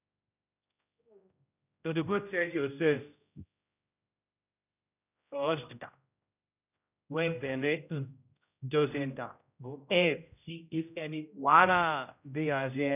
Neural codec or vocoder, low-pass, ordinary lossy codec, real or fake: codec, 16 kHz, 0.5 kbps, X-Codec, HuBERT features, trained on general audio; 3.6 kHz; none; fake